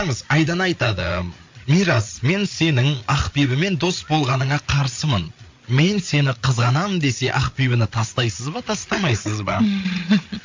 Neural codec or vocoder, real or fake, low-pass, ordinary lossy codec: codec, 16 kHz, 8 kbps, FreqCodec, larger model; fake; 7.2 kHz; MP3, 48 kbps